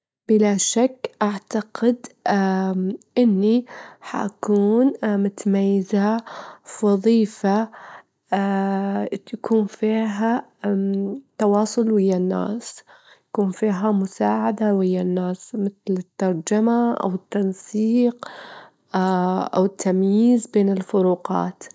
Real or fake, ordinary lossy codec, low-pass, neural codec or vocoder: real; none; none; none